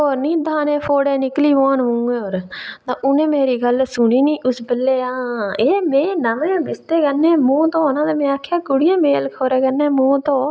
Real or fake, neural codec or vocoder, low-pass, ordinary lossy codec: real; none; none; none